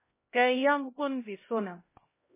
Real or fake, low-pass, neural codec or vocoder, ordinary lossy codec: fake; 3.6 kHz; codec, 16 kHz, 0.5 kbps, X-Codec, HuBERT features, trained on LibriSpeech; MP3, 16 kbps